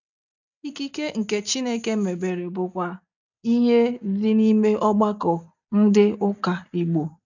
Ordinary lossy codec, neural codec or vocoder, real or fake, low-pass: none; none; real; 7.2 kHz